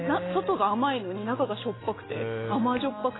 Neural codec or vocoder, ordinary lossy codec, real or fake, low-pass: none; AAC, 16 kbps; real; 7.2 kHz